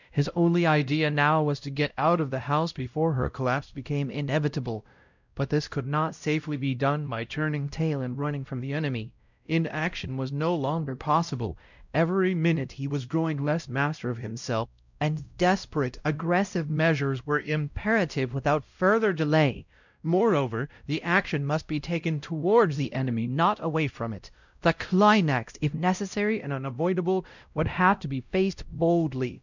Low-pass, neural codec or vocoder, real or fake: 7.2 kHz; codec, 16 kHz, 0.5 kbps, X-Codec, WavLM features, trained on Multilingual LibriSpeech; fake